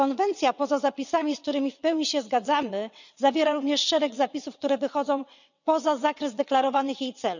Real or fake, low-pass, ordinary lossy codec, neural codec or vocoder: fake; 7.2 kHz; none; vocoder, 22.05 kHz, 80 mel bands, WaveNeXt